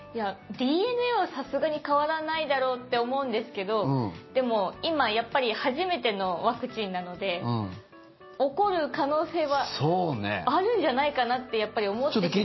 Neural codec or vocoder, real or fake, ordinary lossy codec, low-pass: none; real; MP3, 24 kbps; 7.2 kHz